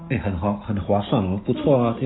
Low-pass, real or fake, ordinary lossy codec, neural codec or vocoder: 7.2 kHz; real; AAC, 16 kbps; none